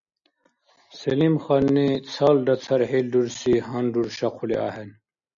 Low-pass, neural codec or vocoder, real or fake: 7.2 kHz; none; real